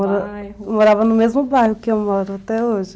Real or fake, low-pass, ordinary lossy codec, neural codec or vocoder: real; none; none; none